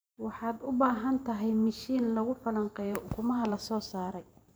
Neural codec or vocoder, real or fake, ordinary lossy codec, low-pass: vocoder, 44.1 kHz, 128 mel bands every 512 samples, BigVGAN v2; fake; none; none